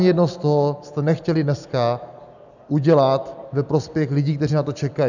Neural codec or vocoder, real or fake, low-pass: none; real; 7.2 kHz